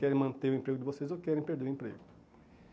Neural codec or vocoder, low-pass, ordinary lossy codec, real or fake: none; none; none; real